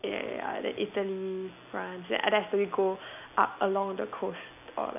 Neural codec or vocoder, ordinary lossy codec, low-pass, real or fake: none; none; 3.6 kHz; real